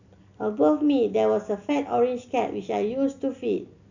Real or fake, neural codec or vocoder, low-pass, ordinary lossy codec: real; none; 7.2 kHz; none